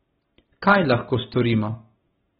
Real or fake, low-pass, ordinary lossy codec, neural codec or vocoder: real; 19.8 kHz; AAC, 16 kbps; none